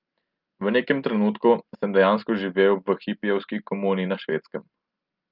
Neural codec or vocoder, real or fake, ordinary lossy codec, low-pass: none; real; Opus, 24 kbps; 5.4 kHz